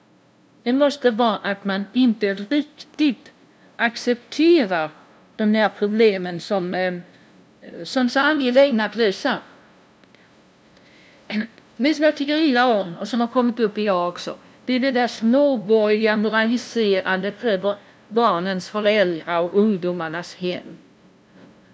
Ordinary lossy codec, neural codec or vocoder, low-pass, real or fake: none; codec, 16 kHz, 0.5 kbps, FunCodec, trained on LibriTTS, 25 frames a second; none; fake